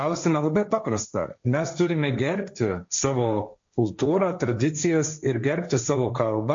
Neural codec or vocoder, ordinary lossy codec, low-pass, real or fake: codec, 16 kHz, 1.1 kbps, Voila-Tokenizer; MP3, 48 kbps; 7.2 kHz; fake